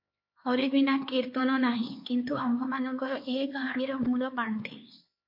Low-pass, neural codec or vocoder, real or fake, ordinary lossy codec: 5.4 kHz; codec, 16 kHz, 4 kbps, X-Codec, HuBERT features, trained on LibriSpeech; fake; MP3, 32 kbps